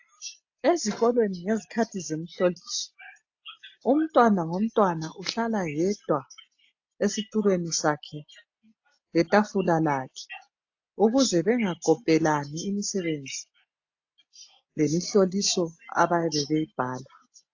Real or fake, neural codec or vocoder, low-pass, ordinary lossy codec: real; none; 7.2 kHz; AAC, 48 kbps